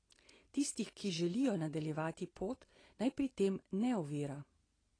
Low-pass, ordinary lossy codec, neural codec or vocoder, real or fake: 9.9 kHz; AAC, 32 kbps; none; real